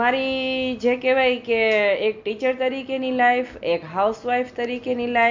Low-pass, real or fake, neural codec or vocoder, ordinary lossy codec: 7.2 kHz; real; none; MP3, 64 kbps